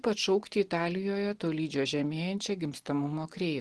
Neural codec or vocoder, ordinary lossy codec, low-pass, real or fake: none; Opus, 16 kbps; 10.8 kHz; real